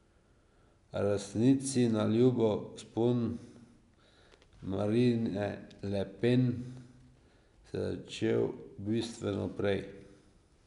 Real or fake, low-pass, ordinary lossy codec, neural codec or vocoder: real; 10.8 kHz; none; none